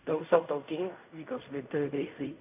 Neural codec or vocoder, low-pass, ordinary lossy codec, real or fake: codec, 16 kHz in and 24 kHz out, 0.4 kbps, LongCat-Audio-Codec, fine tuned four codebook decoder; 3.6 kHz; AAC, 32 kbps; fake